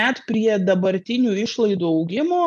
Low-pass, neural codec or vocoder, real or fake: 10.8 kHz; none; real